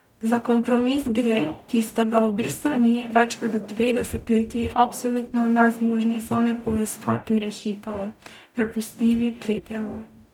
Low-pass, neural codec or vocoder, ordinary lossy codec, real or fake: 19.8 kHz; codec, 44.1 kHz, 0.9 kbps, DAC; none; fake